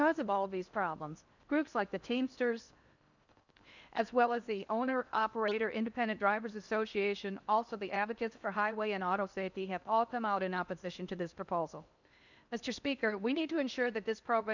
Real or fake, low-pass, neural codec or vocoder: fake; 7.2 kHz; codec, 16 kHz in and 24 kHz out, 0.8 kbps, FocalCodec, streaming, 65536 codes